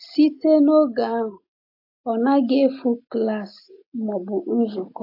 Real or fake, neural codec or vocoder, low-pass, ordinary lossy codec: real; none; 5.4 kHz; AAC, 32 kbps